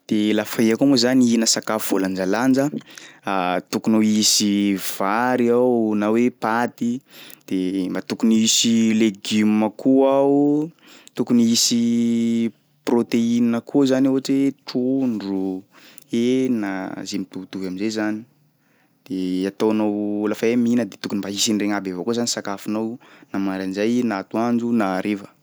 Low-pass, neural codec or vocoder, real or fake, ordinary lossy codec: none; none; real; none